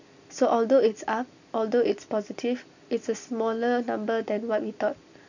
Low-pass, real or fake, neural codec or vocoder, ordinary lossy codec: 7.2 kHz; real; none; none